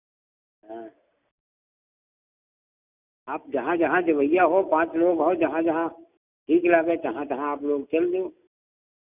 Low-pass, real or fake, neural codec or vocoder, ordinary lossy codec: 3.6 kHz; real; none; none